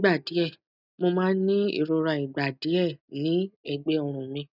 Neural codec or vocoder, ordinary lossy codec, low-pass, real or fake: none; none; 5.4 kHz; real